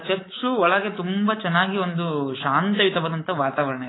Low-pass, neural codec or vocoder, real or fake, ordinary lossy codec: 7.2 kHz; codec, 24 kHz, 3.1 kbps, DualCodec; fake; AAC, 16 kbps